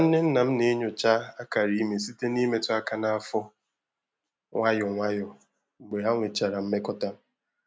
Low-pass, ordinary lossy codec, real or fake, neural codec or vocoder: none; none; real; none